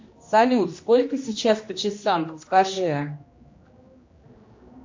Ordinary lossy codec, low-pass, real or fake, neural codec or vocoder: MP3, 48 kbps; 7.2 kHz; fake; codec, 16 kHz, 1 kbps, X-Codec, HuBERT features, trained on general audio